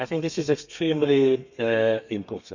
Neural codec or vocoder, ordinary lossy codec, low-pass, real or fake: codec, 32 kHz, 1.9 kbps, SNAC; none; 7.2 kHz; fake